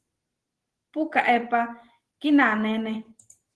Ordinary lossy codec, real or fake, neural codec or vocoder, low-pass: Opus, 16 kbps; real; none; 10.8 kHz